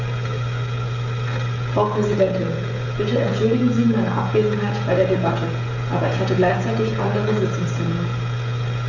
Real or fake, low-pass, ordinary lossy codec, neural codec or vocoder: fake; 7.2 kHz; none; codec, 16 kHz, 16 kbps, FreqCodec, smaller model